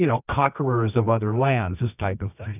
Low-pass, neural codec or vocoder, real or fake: 3.6 kHz; codec, 24 kHz, 0.9 kbps, WavTokenizer, medium music audio release; fake